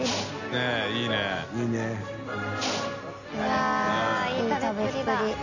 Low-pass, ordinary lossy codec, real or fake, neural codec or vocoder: 7.2 kHz; none; real; none